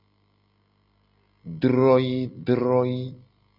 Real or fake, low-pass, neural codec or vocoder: real; 5.4 kHz; none